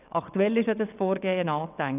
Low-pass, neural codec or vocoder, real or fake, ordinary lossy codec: 3.6 kHz; vocoder, 22.05 kHz, 80 mel bands, WaveNeXt; fake; none